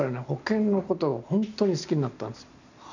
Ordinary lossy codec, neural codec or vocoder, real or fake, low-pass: none; none; real; 7.2 kHz